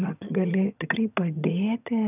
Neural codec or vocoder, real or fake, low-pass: vocoder, 22.05 kHz, 80 mel bands, HiFi-GAN; fake; 3.6 kHz